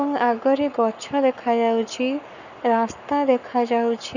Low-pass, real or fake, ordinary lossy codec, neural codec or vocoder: 7.2 kHz; fake; none; codec, 44.1 kHz, 7.8 kbps, Pupu-Codec